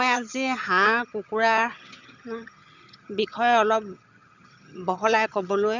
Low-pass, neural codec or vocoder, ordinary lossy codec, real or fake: 7.2 kHz; vocoder, 22.05 kHz, 80 mel bands, HiFi-GAN; none; fake